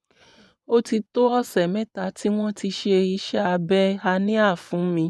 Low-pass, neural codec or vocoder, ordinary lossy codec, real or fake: none; vocoder, 24 kHz, 100 mel bands, Vocos; none; fake